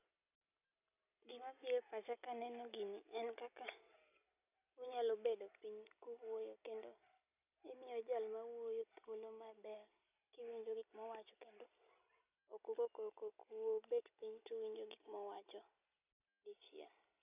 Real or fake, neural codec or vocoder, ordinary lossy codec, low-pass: real; none; none; 3.6 kHz